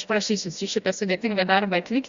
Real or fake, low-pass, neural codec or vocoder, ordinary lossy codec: fake; 7.2 kHz; codec, 16 kHz, 1 kbps, FreqCodec, smaller model; Opus, 64 kbps